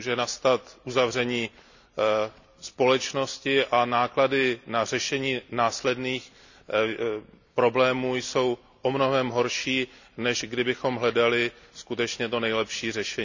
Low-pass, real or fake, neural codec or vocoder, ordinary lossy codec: 7.2 kHz; real; none; none